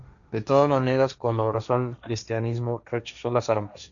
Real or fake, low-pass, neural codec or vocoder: fake; 7.2 kHz; codec, 16 kHz, 1.1 kbps, Voila-Tokenizer